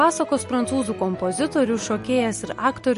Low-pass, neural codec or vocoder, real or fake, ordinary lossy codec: 14.4 kHz; none; real; MP3, 48 kbps